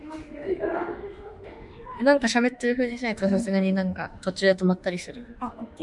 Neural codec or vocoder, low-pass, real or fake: autoencoder, 48 kHz, 32 numbers a frame, DAC-VAE, trained on Japanese speech; 10.8 kHz; fake